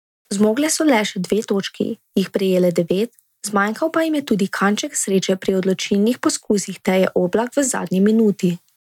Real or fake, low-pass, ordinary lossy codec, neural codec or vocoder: real; 19.8 kHz; none; none